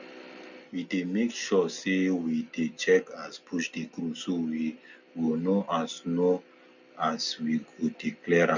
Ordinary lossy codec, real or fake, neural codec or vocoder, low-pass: none; real; none; 7.2 kHz